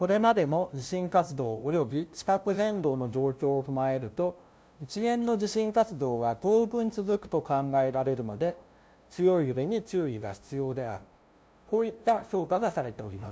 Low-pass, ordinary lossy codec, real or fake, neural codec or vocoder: none; none; fake; codec, 16 kHz, 0.5 kbps, FunCodec, trained on LibriTTS, 25 frames a second